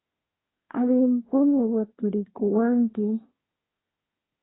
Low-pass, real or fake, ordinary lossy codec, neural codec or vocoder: 7.2 kHz; fake; AAC, 16 kbps; codec, 24 kHz, 1 kbps, SNAC